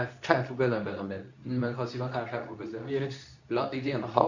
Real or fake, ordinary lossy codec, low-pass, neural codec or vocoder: fake; none; 7.2 kHz; codec, 24 kHz, 0.9 kbps, WavTokenizer, medium speech release version 2